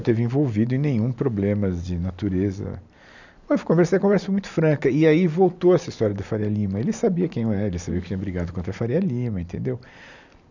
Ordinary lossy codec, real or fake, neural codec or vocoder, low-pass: none; real; none; 7.2 kHz